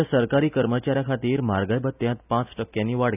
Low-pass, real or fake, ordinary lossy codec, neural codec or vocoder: 3.6 kHz; real; none; none